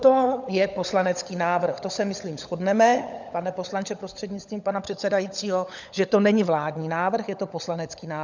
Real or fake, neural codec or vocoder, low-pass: fake; codec, 16 kHz, 16 kbps, FunCodec, trained on LibriTTS, 50 frames a second; 7.2 kHz